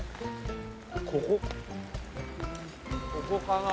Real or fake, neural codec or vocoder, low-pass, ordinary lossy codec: real; none; none; none